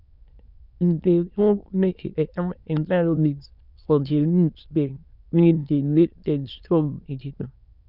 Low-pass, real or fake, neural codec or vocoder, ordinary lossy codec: 5.4 kHz; fake; autoencoder, 22.05 kHz, a latent of 192 numbers a frame, VITS, trained on many speakers; none